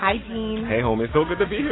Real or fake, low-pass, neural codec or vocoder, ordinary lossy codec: real; 7.2 kHz; none; AAC, 16 kbps